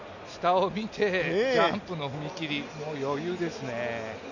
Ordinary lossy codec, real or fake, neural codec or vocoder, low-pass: none; real; none; 7.2 kHz